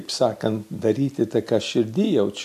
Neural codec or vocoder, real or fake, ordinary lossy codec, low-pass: none; real; MP3, 96 kbps; 14.4 kHz